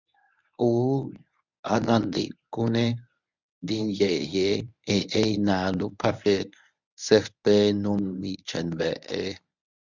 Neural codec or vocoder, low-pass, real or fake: codec, 24 kHz, 0.9 kbps, WavTokenizer, medium speech release version 1; 7.2 kHz; fake